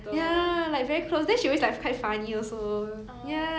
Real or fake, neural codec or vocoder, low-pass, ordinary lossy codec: real; none; none; none